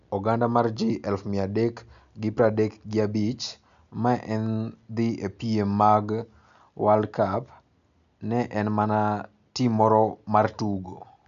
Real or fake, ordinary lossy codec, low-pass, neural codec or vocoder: real; none; 7.2 kHz; none